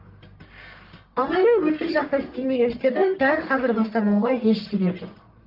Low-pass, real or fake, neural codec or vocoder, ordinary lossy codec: 5.4 kHz; fake; codec, 44.1 kHz, 1.7 kbps, Pupu-Codec; Opus, 24 kbps